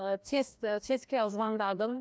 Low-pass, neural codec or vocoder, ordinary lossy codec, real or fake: none; codec, 16 kHz, 1 kbps, FreqCodec, larger model; none; fake